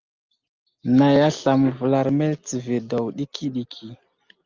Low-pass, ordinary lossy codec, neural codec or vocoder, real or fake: 7.2 kHz; Opus, 24 kbps; none; real